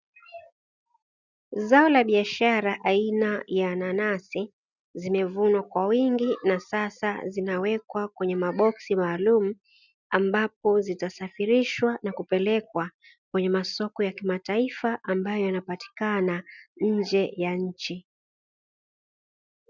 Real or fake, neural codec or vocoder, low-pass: real; none; 7.2 kHz